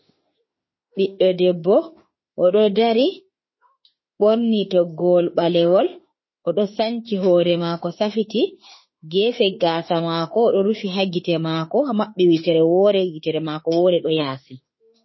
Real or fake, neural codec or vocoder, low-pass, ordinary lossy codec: fake; autoencoder, 48 kHz, 32 numbers a frame, DAC-VAE, trained on Japanese speech; 7.2 kHz; MP3, 24 kbps